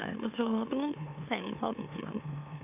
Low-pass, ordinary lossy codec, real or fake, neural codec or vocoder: 3.6 kHz; none; fake; autoencoder, 44.1 kHz, a latent of 192 numbers a frame, MeloTTS